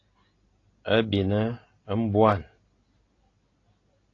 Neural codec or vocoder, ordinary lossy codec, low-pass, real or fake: none; AAC, 32 kbps; 7.2 kHz; real